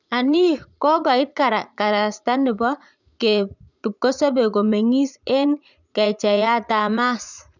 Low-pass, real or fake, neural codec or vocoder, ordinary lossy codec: 7.2 kHz; fake; vocoder, 44.1 kHz, 80 mel bands, Vocos; none